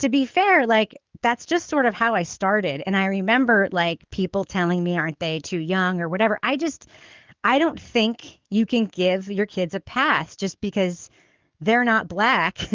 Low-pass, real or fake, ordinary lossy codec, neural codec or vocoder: 7.2 kHz; fake; Opus, 32 kbps; codec, 44.1 kHz, 7.8 kbps, DAC